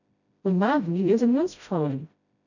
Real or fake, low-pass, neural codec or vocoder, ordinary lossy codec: fake; 7.2 kHz; codec, 16 kHz, 0.5 kbps, FreqCodec, smaller model; none